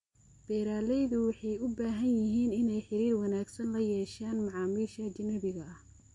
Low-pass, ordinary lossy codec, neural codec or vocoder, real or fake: 10.8 kHz; MP3, 48 kbps; none; real